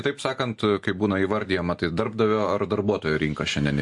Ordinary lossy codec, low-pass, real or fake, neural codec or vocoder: MP3, 48 kbps; 10.8 kHz; real; none